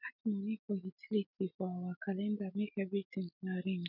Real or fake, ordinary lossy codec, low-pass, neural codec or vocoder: real; none; 5.4 kHz; none